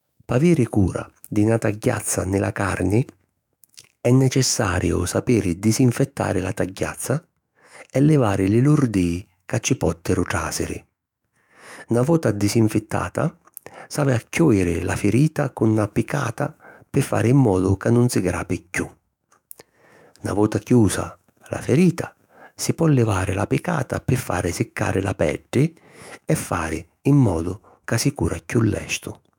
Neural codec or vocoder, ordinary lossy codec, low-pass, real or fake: autoencoder, 48 kHz, 128 numbers a frame, DAC-VAE, trained on Japanese speech; none; 19.8 kHz; fake